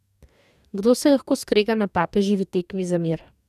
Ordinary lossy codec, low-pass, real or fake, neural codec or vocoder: none; 14.4 kHz; fake; codec, 44.1 kHz, 2.6 kbps, DAC